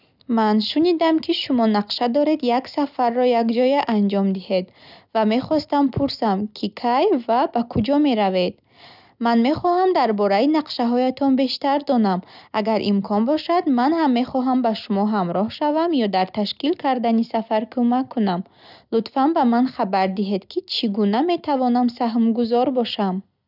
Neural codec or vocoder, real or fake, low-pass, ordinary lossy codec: none; real; 5.4 kHz; none